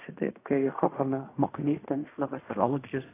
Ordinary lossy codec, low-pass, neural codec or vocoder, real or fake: AAC, 24 kbps; 3.6 kHz; codec, 16 kHz in and 24 kHz out, 0.4 kbps, LongCat-Audio-Codec, fine tuned four codebook decoder; fake